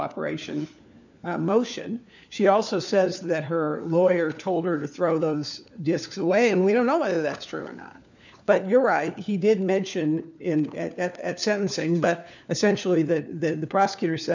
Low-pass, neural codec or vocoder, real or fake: 7.2 kHz; codec, 16 kHz, 4 kbps, FunCodec, trained on LibriTTS, 50 frames a second; fake